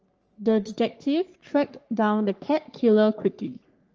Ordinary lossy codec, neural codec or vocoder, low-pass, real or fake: Opus, 24 kbps; codec, 44.1 kHz, 3.4 kbps, Pupu-Codec; 7.2 kHz; fake